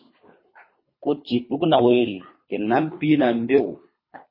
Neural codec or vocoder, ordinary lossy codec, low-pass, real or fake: codec, 24 kHz, 3 kbps, HILCodec; MP3, 24 kbps; 5.4 kHz; fake